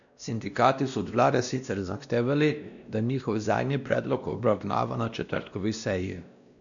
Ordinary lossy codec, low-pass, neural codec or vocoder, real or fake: none; 7.2 kHz; codec, 16 kHz, 1 kbps, X-Codec, WavLM features, trained on Multilingual LibriSpeech; fake